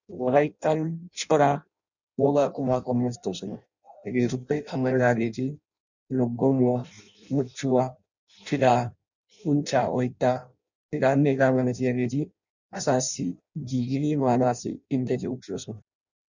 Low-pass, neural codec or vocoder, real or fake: 7.2 kHz; codec, 16 kHz in and 24 kHz out, 0.6 kbps, FireRedTTS-2 codec; fake